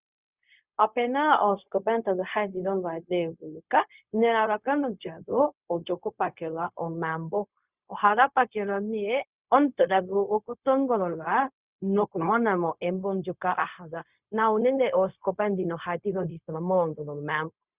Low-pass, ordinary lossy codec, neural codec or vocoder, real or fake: 3.6 kHz; Opus, 64 kbps; codec, 16 kHz, 0.4 kbps, LongCat-Audio-Codec; fake